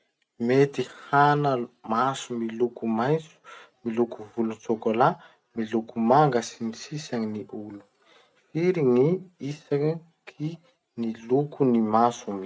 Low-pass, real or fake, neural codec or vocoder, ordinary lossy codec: none; real; none; none